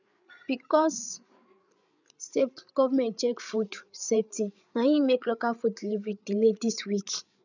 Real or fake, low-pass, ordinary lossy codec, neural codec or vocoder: fake; 7.2 kHz; none; codec, 16 kHz, 8 kbps, FreqCodec, larger model